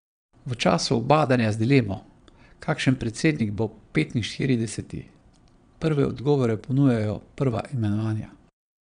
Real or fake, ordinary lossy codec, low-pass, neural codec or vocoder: fake; none; 9.9 kHz; vocoder, 22.05 kHz, 80 mel bands, Vocos